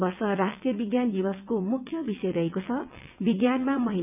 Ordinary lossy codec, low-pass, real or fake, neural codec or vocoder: none; 3.6 kHz; fake; vocoder, 22.05 kHz, 80 mel bands, WaveNeXt